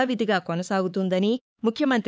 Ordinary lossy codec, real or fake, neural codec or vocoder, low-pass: none; fake; codec, 16 kHz, 4 kbps, X-Codec, HuBERT features, trained on LibriSpeech; none